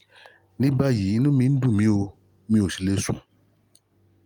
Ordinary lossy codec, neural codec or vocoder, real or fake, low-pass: Opus, 32 kbps; none; real; 19.8 kHz